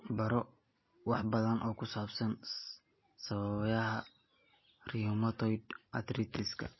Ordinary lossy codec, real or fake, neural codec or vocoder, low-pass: MP3, 24 kbps; real; none; 7.2 kHz